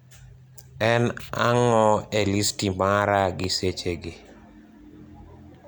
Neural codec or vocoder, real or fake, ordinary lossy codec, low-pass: none; real; none; none